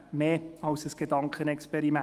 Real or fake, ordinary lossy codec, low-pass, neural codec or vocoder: fake; Opus, 32 kbps; 14.4 kHz; autoencoder, 48 kHz, 128 numbers a frame, DAC-VAE, trained on Japanese speech